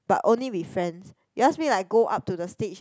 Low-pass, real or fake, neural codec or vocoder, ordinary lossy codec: none; real; none; none